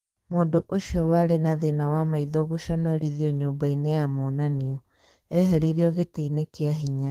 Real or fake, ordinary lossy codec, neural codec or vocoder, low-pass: fake; Opus, 24 kbps; codec, 32 kHz, 1.9 kbps, SNAC; 14.4 kHz